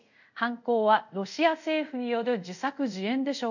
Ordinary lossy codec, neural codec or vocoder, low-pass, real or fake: none; codec, 24 kHz, 0.5 kbps, DualCodec; 7.2 kHz; fake